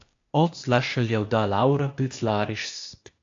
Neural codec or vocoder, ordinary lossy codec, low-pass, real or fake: codec, 16 kHz, 0.8 kbps, ZipCodec; AAC, 64 kbps; 7.2 kHz; fake